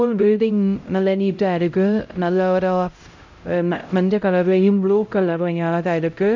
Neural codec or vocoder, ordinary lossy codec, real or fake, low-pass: codec, 16 kHz, 0.5 kbps, X-Codec, HuBERT features, trained on LibriSpeech; MP3, 48 kbps; fake; 7.2 kHz